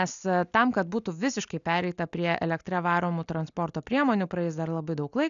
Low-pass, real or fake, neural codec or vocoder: 7.2 kHz; real; none